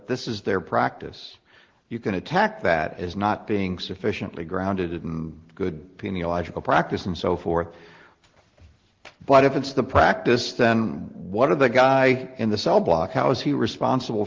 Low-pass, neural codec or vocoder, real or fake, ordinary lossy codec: 7.2 kHz; none; real; Opus, 16 kbps